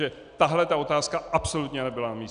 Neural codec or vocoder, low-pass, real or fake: none; 9.9 kHz; real